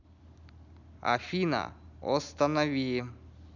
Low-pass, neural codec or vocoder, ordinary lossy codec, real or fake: 7.2 kHz; none; none; real